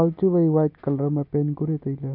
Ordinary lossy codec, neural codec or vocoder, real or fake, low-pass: none; none; real; 5.4 kHz